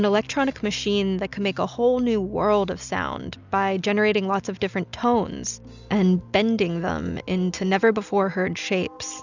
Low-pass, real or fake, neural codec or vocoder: 7.2 kHz; real; none